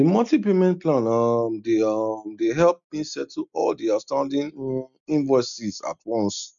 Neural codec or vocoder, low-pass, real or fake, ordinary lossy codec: none; 7.2 kHz; real; none